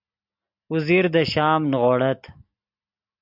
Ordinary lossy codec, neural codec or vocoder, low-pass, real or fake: MP3, 48 kbps; none; 5.4 kHz; real